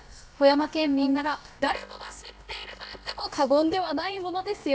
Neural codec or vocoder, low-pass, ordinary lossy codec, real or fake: codec, 16 kHz, about 1 kbps, DyCAST, with the encoder's durations; none; none; fake